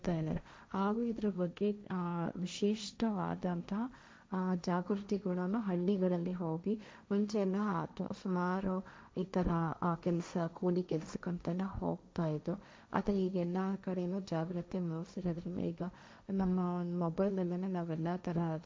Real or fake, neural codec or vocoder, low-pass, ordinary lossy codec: fake; codec, 16 kHz, 1.1 kbps, Voila-Tokenizer; none; none